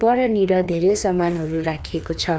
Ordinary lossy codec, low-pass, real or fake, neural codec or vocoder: none; none; fake; codec, 16 kHz, 2 kbps, FreqCodec, larger model